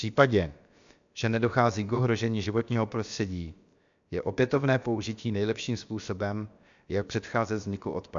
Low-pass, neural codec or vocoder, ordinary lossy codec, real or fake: 7.2 kHz; codec, 16 kHz, about 1 kbps, DyCAST, with the encoder's durations; MP3, 64 kbps; fake